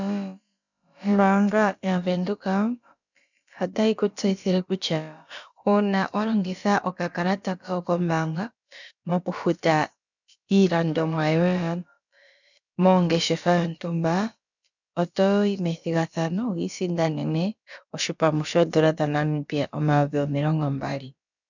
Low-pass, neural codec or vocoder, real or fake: 7.2 kHz; codec, 16 kHz, about 1 kbps, DyCAST, with the encoder's durations; fake